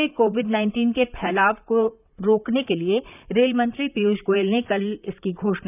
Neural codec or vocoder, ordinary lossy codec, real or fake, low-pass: vocoder, 44.1 kHz, 128 mel bands, Pupu-Vocoder; none; fake; 3.6 kHz